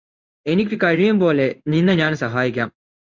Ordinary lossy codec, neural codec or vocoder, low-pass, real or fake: MP3, 64 kbps; codec, 16 kHz in and 24 kHz out, 1 kbps, XY-Tokenizer; 7.2 kHz; fake